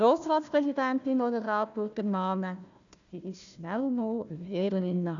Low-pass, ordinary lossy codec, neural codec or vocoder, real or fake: 7.2 kHz; none; codec, 16 kHz, 1 kbps, FunCodec, trained on Chinese and English, 50 frames a second; fake